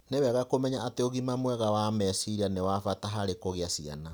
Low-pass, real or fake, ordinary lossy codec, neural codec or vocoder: none; real; none; none